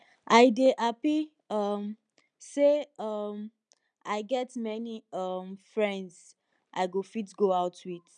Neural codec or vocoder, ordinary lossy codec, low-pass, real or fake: none; none; 9.9 kHz; real